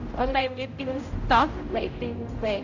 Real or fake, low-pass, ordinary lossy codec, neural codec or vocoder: fake; 7.2 kHz; none; codec, 16 kHz, 0.5 kbps, X-Codec, HuBERT features, trained on general audio